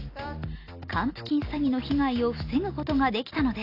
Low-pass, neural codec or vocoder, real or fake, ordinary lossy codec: 5.4 kHz; none; real; AAC, 32 kbps